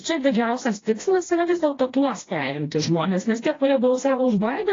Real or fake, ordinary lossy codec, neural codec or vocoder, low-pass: fake; AAC, 32 kbps; codec, 16 kHz, 1 kbps, FreqCodec, smaller model; 7.2 kHz